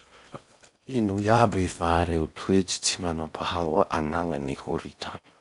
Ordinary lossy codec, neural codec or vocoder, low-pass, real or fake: none; codec, 16 kHz in and 24 kHz out, 0.8 kbps, FocalCodec, streaming, 65536 codes; 10.8 kHz; fake